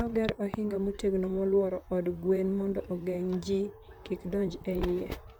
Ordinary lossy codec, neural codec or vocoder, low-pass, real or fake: none; vocoder, 44.1 kHz, 128 mel bands, Pupu-Vocoder; none; fake